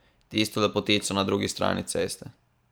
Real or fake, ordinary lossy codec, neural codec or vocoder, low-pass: fake; none; vocoder, 44.1 kHz, 128 mel bands every 512 samples, BigVGAN v2; none